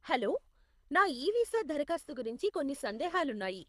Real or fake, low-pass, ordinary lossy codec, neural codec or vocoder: fake; none; none; codec, 24 kHz, 6 kbps, HILCodec